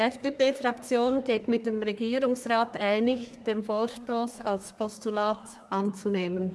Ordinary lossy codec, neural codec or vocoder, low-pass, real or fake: none; codec, 24 kHz, 1 kbps, SNAC; none; fake